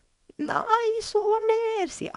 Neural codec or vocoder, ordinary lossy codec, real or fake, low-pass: codec, 24 kHz, 0.9 kbps, WavTokenizer, small release; none; fake; 10.8 kHz